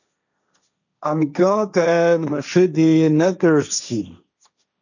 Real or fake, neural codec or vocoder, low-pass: fake; codec, 16 kHz, 1.1 kbps, Voila-Tokenizer; 7.2 kHz